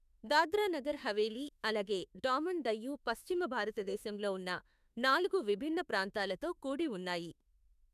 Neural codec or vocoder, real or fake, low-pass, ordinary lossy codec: autoencoder, 48 kHz, 32 numbers a frame, DAC-VAE, trained on Japanese speech; fake; 14.4 kHz; none